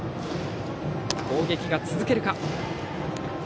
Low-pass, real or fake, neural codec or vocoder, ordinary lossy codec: none; real; none; none